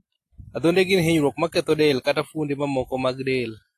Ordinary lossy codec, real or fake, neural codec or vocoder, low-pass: AAC, 48 kbps; real; none; 14.4 kHz